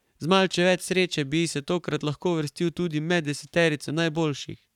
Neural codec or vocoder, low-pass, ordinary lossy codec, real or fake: codec, 44.1 kHz, 7.8 kbps, Pupu-Codec; 19.8 kHz; none; fake